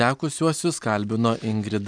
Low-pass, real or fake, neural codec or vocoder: 9.9 kHz; real; none